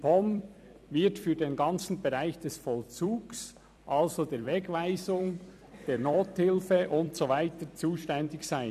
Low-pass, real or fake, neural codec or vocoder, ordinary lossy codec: 14.4 kHz; fake; vocoder, 44.1 kHz, 128 mel bands every 256 samples, BigVGAN v2; none